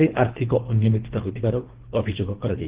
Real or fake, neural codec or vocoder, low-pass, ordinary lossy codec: fake; codec, 24 kHz, 3 kbps, HILCodec; 3.6 kHz; Opus, 16 kbps